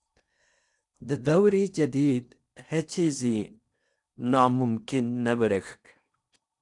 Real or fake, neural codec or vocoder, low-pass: fake; codec, 16 kHz in and 24 kHz out, 0.8 kbps, FocalCodec, streaming, 65536 codes; 10.8 kHz